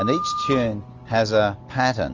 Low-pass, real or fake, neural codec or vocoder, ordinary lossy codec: 7.2 kHz; real; none; Opus, 24 kbps